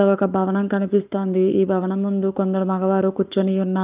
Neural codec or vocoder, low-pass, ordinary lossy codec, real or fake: codec, 44.1 kHz, 7.8 kbps, Pupu-Codec; 3.6 kHz; Opus, 24 kbps; fake